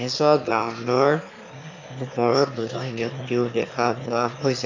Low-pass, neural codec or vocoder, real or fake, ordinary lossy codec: 7.2 kHz; autoencoder, 22.05 kHz, a latent of 192 numbers a frame, VITS, trained on one speaker; fake; AAC, 48 kbps